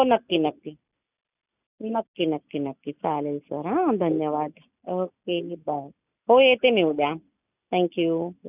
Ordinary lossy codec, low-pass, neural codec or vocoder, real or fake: none; 3.6 kHz; none; real